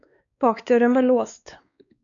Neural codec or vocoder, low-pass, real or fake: codec, 16 kHz, 2 kbps, X-Codec, WavLM features, trained on Multilingual LibriSpeech; 7.2 kHz; fake